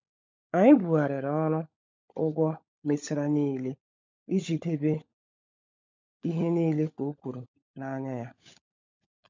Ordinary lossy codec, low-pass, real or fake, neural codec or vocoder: MP3, 64 kbps; 7.2 kHz; fake; codec, 16 kHz, 16 kbps, FunCodec, trained on LibriTTS, 50 frames a second